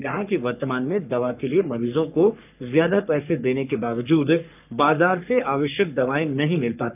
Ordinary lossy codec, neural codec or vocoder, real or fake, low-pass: none; codec, 44.1 kHz, 3.4 kbps, Pupu-Codec; fake; 3.6 kHz